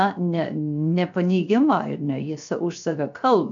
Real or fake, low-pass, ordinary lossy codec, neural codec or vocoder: fake; 7.2 kHz; MP3, 64 kbps; codec, 16 kHz, 0.7 kbps, FocalCodec